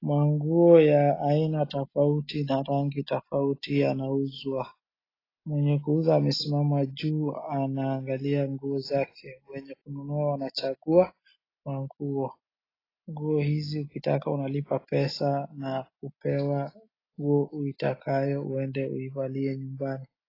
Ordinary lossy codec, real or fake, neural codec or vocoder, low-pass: AAC, 24 kbps; real; none; 5.4 kHz